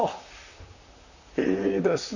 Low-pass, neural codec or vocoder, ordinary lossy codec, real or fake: 7.2 kHz; vocoder, 44.1 kHz, 128 mel bands, Pupu-Vocoder; none; fake